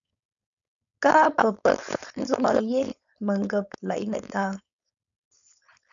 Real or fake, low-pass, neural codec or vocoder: fake; 7.2 kHz; codec, 16 kHz, 4.8 kbps, FACodec